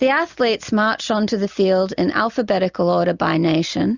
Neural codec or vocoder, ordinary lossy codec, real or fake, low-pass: none; Opus, 64 kbps; real; 7.2 kHz